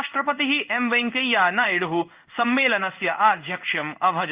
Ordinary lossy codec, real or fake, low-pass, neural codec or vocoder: Opus, 32 kbps; fake; 3.6 kHz; codec, 16 kHz in and 24 kHz out, 1 kbps, XY-Tokenizer